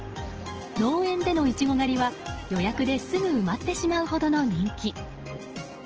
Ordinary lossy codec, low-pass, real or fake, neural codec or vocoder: Opus, 16 kbps; 7.2 kHz; real; none